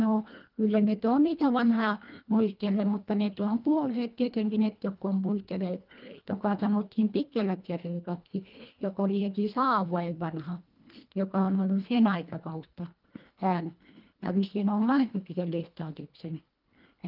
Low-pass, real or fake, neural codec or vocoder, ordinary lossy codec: 5.4 kHz; fake; codec, 24 kHz, 1.5 kbps, HILCodec; Opus, 24 kbps